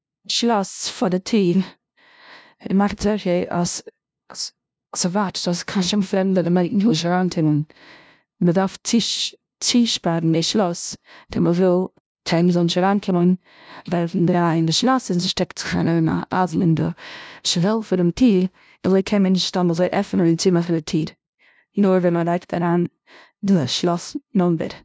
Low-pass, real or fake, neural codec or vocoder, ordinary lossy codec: none; fake; codec, 16 kHz, 0.5 kbps, FunCodec, trained on LibriTTS, 25 frames a second; none